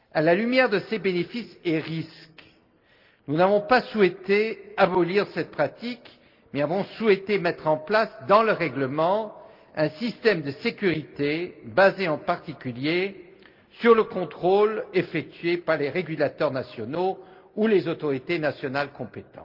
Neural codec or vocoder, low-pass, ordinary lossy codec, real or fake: none; 5.4 kHz; Opus, 32 kbps; real